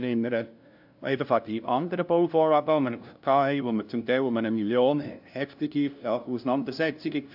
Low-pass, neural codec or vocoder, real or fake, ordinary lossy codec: 5.4 kHz; codec, 16 kHz, 0.5 kbps, FunCodec, trained on LibriTTS, 25 frames a second; fake; AAC, 48 kbps